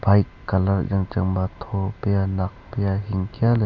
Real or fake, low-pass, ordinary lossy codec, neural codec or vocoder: real; 7.2 kHz; none; none